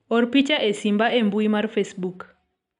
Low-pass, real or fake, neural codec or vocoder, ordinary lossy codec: 10.8 kHz; real; none; none